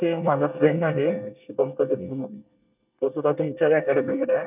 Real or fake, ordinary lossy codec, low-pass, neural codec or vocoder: fake; none; 3.6 kHz; codec, 24 kHz, 1 kbps, SNAC